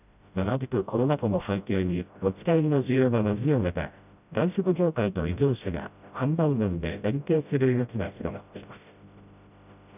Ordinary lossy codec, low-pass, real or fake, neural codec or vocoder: none; 3.6 kHz; fake; codec, 16 kHz, 0.5 kbps, FreqCodec, smaller model